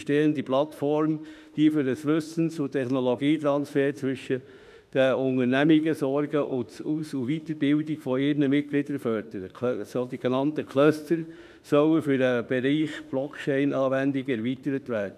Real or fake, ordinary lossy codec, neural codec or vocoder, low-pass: fake; none; autoencoder, 48 kHz, 32 numbers a frame, DAC-VAE, trained on Japanese speech; 14.4 kHz